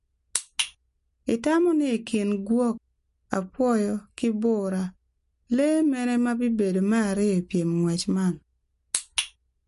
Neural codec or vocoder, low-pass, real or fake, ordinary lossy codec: none; 14.4 kHz; real; MP3, 48 kbps